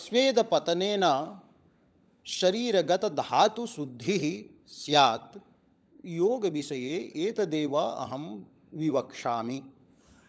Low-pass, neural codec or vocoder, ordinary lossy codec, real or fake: none; codec, 16 kHz, 16 kbps, FunCodec, trained on LibriTTS, 50 frames a second; none; fake